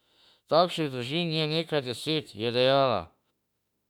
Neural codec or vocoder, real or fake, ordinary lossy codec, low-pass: autoencoder, 48 kHz, 32 numbers a frame, DAC-VAE, trained on Japanese speech; fake; none; 19.8 kHz